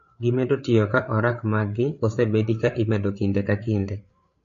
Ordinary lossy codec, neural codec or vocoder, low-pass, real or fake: MP3, 48 kbps; codec, 16 kHz, 8 kbps, FreqCodec, larger model; 7.2 kHz; fake